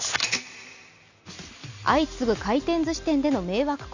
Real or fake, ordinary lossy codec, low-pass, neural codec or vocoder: real; none; 7.2 kHz; none